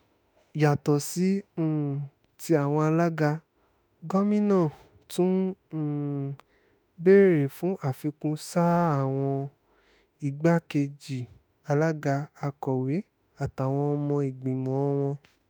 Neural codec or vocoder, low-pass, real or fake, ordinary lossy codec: autoencoder, 48 kHz, 32 numbers a frame, DAC-VAE, trained on Japanese speech; none; fake; none